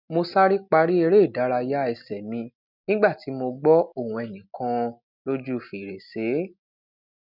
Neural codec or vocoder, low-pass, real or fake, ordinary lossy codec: none; 5.4 kHz; real; none